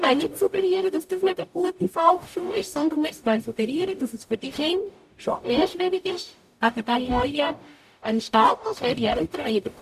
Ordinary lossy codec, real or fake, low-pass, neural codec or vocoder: none; fake; 14.4 kHz; codec, 44.1 kHz, 0.9 kbps, DAC